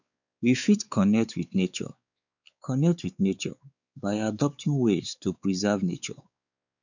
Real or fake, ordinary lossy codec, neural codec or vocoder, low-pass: fake; none; codec, 16 kHz, 4 kbps, X-Codec, WavLM features, trained on Multilingual LibriSpeech; 7.2 kHz